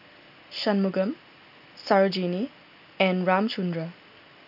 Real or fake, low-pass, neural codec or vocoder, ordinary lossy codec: real; 5.4 kHz; none; none